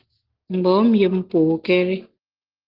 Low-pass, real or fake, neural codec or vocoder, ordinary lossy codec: 5.4 kHz; real; none; Opus, 16 kbps